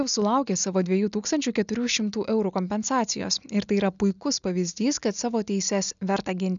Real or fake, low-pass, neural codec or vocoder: real; 7.2 kHz; none